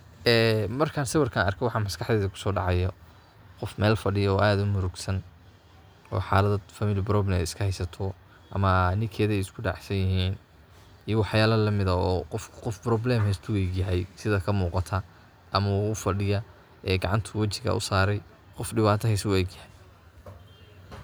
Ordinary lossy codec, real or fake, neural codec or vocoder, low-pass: none; real; none; none